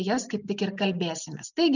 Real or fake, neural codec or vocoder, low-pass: real; none; 7.2 kHz